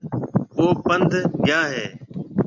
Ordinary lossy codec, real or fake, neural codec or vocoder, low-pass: MP3, 64 kbps; real; none; 7.2 kHz